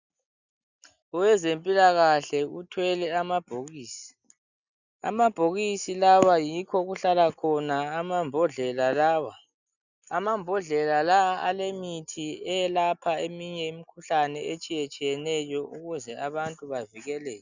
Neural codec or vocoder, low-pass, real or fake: none; 7.2 kHz; real